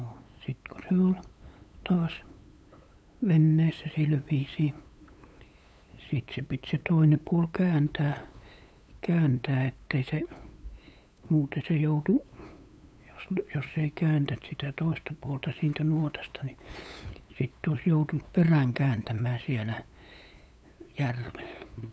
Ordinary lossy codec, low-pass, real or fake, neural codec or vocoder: none; none; fake; codec, 16 kHz, 8 kbps, FunCodec, trained on LibriTTS, 25 frames a second